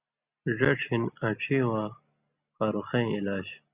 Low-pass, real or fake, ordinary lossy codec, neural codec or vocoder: 3.6 kHz; real; Opus, 64 kbps; none